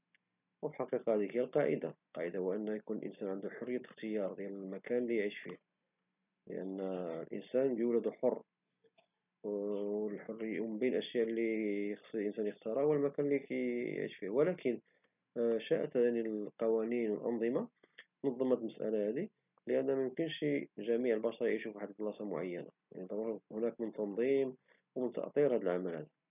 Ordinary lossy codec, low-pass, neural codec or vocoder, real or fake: none; 3.6 kHz; none; real